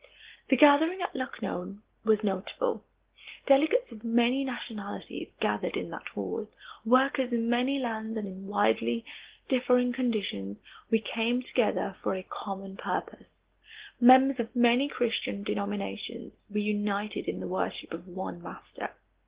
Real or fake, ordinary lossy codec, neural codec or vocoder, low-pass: real; Opus, 16 kbps; none; 3.6 kHz